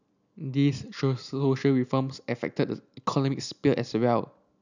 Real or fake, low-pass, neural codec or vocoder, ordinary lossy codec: real; 7.2 kHz; none; none